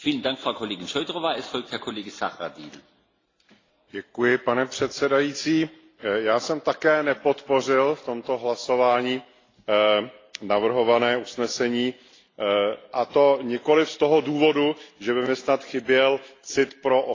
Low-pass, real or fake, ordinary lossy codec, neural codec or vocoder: 7.2 kHz; real; AAC, 32 kbps; none